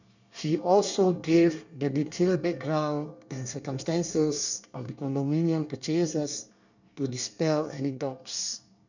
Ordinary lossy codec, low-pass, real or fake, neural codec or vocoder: none; 7.2 kHz; fake; codec, 24 kHz, 1 kbps, SNAC